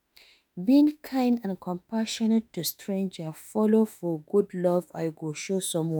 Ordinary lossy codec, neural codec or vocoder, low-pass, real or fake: none; autoencoder, 48 kHz, 32 numbers a frame, DAC-VAE, trained on Japanese speech; none; fake